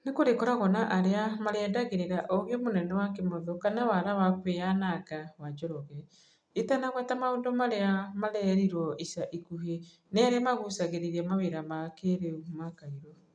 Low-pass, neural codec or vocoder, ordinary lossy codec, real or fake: 9.9 kHz; none; none; real